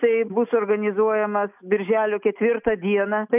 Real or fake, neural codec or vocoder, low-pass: real; none; 3.6 kHz